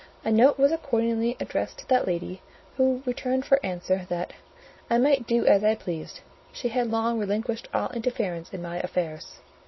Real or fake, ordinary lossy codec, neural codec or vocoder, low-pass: real; MP3, 24 kbps; none; 7.2 kHz